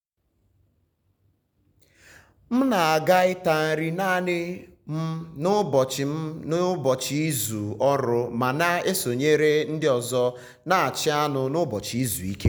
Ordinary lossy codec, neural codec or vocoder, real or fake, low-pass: none; none; real; none